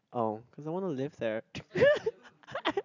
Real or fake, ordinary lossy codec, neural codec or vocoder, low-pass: real; none; none; 7.2 kHz